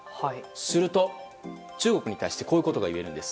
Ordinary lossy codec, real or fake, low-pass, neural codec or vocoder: none; real; none; none